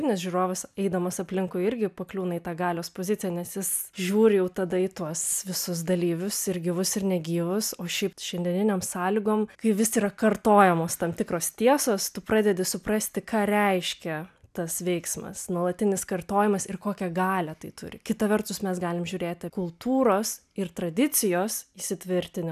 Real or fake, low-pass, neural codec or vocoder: real; 14.4 kHz; none